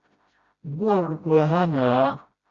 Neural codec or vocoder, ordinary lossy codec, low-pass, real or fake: codec, 16 kHz, 0.5 kbps, FreqCodec, smaller model; Opus, 64 kbps; 7.2 kHz; fake